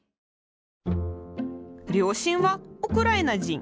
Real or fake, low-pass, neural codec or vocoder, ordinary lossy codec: real; none; none; none